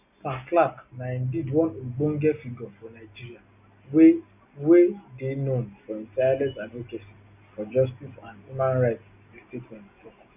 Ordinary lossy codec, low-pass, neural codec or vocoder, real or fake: none; 3.6 kHz; none; real